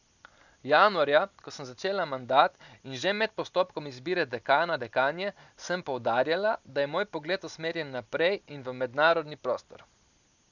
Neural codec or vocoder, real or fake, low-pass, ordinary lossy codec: none; real; 7.2 kHz; none